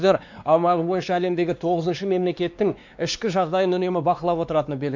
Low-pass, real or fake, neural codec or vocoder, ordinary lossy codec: 7.2 kHz; fake; codec, 16 kHz, 2 kbps, X-Codec, WavLM features, trained on Multilingual LibriSpeech; MP3, 64 kbps